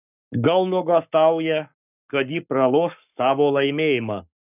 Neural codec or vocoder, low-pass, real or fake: codec, 44.1 kHz, 7.8 kbps, Pupu-Codec; 3.6 kHz; fake